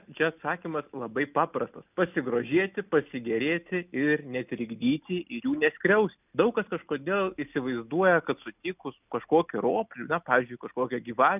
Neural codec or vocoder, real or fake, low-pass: none; real; 3.6 kHz